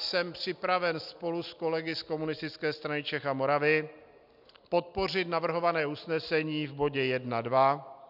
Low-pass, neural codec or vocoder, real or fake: 5.4 kHz; none; real